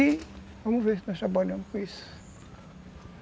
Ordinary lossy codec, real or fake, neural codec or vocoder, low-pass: none; real; none; none